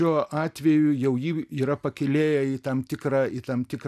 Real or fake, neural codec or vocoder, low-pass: real; none; 14.4 kHz